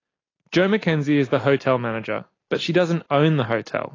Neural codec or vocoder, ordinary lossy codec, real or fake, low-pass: none; AAC, 32 kbps; real; 7.2 kHz